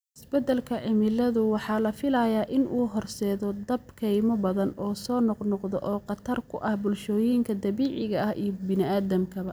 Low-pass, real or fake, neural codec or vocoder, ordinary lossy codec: none; real; none; none